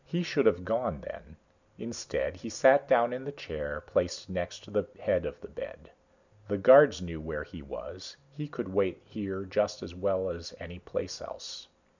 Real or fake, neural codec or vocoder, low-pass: real; none; 7.2 kHz